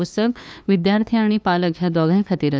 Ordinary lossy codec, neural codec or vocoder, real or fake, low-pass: none; codec, 16 kHz, 2 kbps, FunCodec, trained on LibriTTS, 25 frames a second; fake; none